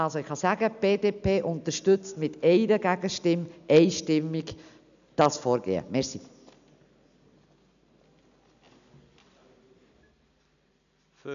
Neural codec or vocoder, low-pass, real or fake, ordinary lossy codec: none; 7.2 kHz; real; none